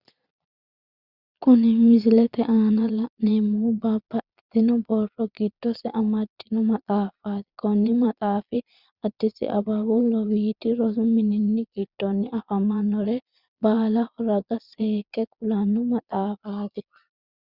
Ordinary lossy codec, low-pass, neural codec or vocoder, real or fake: Opus, 64 kbps; 5.4 kHz; vocoder, 22.05 kHz, 80 mel bands, Vocos; fake